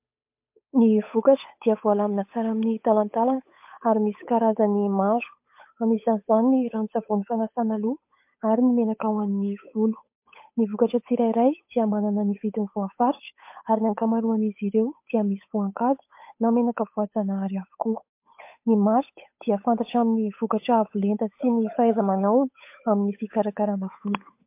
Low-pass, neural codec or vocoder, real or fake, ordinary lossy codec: 3.6 kHz; codec, 16 kHz, 8 kbps, FunCodec, trained on Chinese and English, 25 frames a second; fake; MP3, 32 kbps